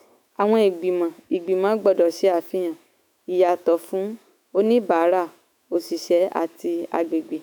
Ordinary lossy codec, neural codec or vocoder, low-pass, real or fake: none; autoencoder, 48 kHz, 128 numbers a frame, DAC-VAE, trained on Japanese speech; none; fake